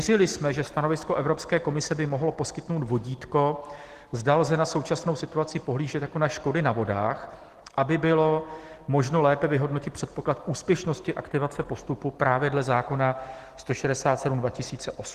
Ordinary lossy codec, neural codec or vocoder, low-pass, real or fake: Opus, 16 kbps; none; 14.4 kHz; real